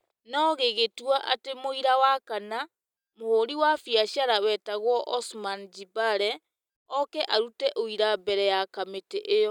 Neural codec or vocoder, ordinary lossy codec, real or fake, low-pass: none; none; real; 19.8 kHz